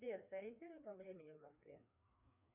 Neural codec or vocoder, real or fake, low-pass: codec, 16 kHz, 2 kbps, FreqCodec, larger model; fake; 3.6 kHz